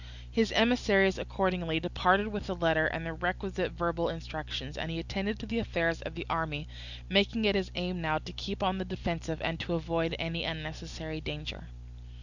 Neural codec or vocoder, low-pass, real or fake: none; 7.2 kHz; real